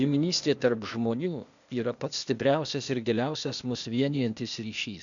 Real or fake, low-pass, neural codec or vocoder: fake; 7.2 kHz; codec, 16 kHz, 0.8 kbps, ZipCodec